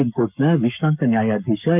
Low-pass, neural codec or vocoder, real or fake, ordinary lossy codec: 3.6 kHz; codec, 44.1 kHz, 7.8 kbps, Pupu-Codec; fake; none